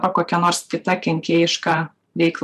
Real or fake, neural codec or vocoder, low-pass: fake; vocoder, 44.1 kHz, 128 mel bands every 512 samples, BigVGAN v2; 14.4 kHz